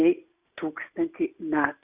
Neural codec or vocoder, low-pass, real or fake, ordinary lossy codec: none; 3.6 kHz; real; Opus, 64 kbps